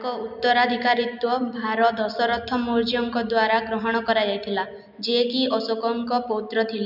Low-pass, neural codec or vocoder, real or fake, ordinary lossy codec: 5.4 kHz; none; real; none